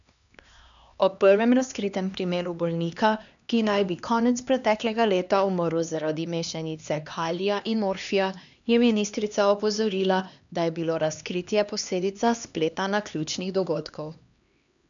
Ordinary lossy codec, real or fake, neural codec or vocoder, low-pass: none; fake; codec, 16 kHz, 2 kbps, X-Codec, HuBERT features, trained on LibriSpeech; 7.2 kHz